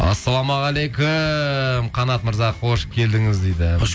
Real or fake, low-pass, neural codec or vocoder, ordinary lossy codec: real; none; none; none